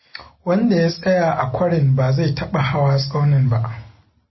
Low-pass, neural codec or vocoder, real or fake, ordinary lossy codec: 7.2 kHz; none; real; MP3, 24 kbps